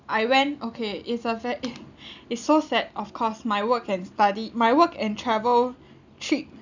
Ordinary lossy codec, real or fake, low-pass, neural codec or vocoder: none; real; 7.2 kHz; none